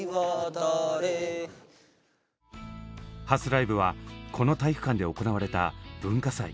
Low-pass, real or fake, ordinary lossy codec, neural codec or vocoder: none; real; none; none